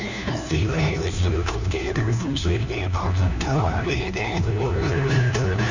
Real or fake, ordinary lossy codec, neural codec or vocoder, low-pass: fake; none; codec, 16 kHz, 1 kbps, FunCodec, trained on LibriTTS, 50 frames a second; 7.2 kHz